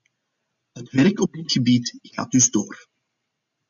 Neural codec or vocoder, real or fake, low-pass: none; real; 7.2 kHz